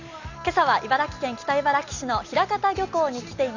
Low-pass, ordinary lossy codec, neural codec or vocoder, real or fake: 7.2 kHz; none; none; real